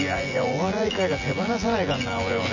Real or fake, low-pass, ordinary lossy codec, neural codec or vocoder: fake; 7.2 kHz; none; vocoder, 24 kHz, 100 mel bands, Vocos